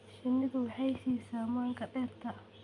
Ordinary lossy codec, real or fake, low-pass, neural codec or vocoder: none; real; 10.8 kHz; none